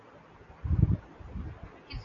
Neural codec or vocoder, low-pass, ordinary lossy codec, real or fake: none; 7.2 kHz; AAC, 64 kbps; real